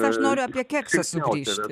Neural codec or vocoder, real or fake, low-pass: none; real; 14.4 kHz